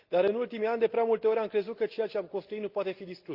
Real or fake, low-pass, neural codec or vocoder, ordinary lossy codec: real; 5.4 kHz; none; Opus, 32 kbps